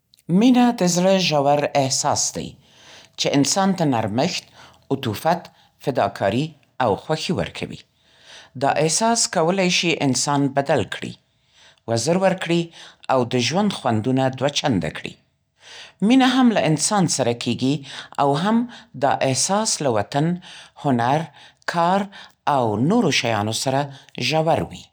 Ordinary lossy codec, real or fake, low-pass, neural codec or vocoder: none; real; none; none